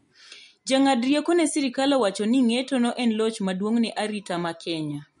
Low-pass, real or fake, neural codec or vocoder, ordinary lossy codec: 19.8 kHz; real; none; MP3, 48 kbps